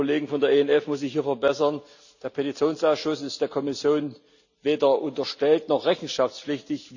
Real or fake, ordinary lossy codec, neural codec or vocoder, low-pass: real; none; none; 7.2 kHz